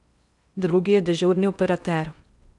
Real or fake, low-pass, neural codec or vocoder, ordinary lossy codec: fake; 10.8 kHz; codec, 16 kHz in and 24 kHz out, 0.6 kbps, FocalCodec, streaming, 4096 codes; none